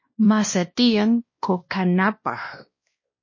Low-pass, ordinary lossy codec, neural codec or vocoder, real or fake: 7.2 kHz; MP3, 32 kbps; codec, 16 kHz, 1 kbps, X-Codec, WavLM features, trained on Multilingual LibriSpeech; fake